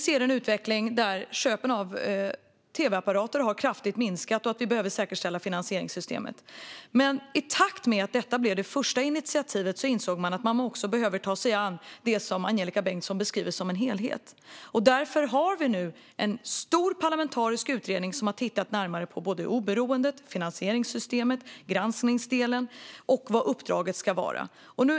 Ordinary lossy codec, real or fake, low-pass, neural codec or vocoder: none; real; none; none